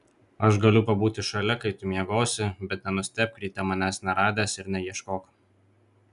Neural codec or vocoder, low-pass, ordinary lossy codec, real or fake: none; 10.8 kHz; MP3, 96 kbps; real